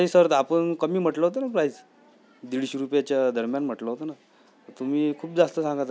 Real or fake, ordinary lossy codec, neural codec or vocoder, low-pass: real; none; none; none